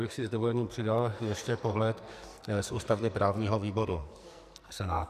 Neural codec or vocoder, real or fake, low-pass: codec, 44.1 kHz, 2.6 kbps, SNAC; fake; 14.4 kHz